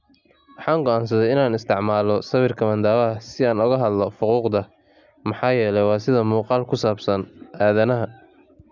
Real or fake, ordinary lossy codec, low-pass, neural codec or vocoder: real; none; 7.2 kHz; none